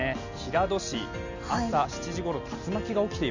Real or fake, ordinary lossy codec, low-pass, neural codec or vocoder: real; none; 7.2 kHz; none